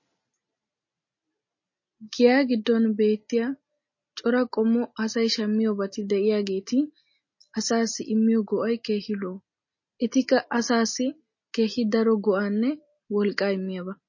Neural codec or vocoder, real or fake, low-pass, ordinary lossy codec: none; real; 7.2 kHz; MP3, 32 kbps